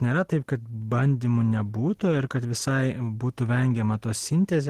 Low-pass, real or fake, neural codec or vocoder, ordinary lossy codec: 14.4 kHz; real; none; Opus, 16 kbps